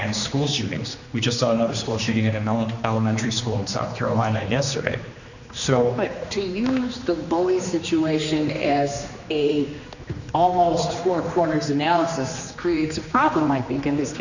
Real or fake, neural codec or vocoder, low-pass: fake; codec, 16 kHz, 2 kbps, X-Codec, HuBERT features, trained on general audio; 7.2 kHz